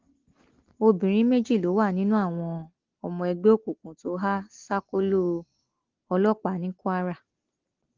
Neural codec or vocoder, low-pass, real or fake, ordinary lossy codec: none; 7.2 kHz; real; Opus, 16 kbps